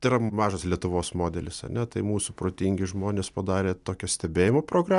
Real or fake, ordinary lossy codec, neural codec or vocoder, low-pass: real; MP3, 96 kbps; none; 10.8 kHz